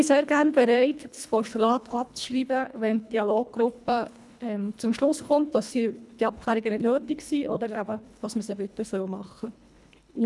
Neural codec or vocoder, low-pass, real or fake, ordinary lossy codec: codec, 24 kHz, 1.5 kbps, HILCodec; none; fake; none